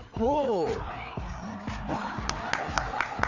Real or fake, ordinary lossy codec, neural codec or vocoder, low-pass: fake; none; codec, 16 kHz, 4 kbps, FreqCodec, larger model; 7.2 kHz